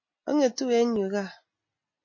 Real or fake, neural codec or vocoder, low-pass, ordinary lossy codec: real; none; 7.2 kHz; MP3, 32 kbps